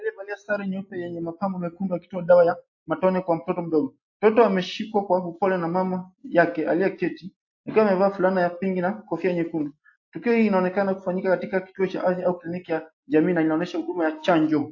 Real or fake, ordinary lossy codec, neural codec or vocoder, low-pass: real; AAC, 48 kbps; none; 7.2 kHz